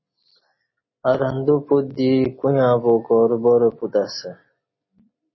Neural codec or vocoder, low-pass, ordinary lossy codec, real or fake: none; 7.2 kHz; MP3, 24 kbps; real